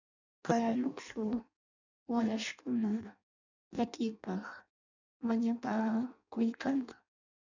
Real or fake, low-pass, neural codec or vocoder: fake; 7.2 kHz; codec, 16 kHz in and 24 kHz out, 0.6 kbps, FireRedTTS-2 codec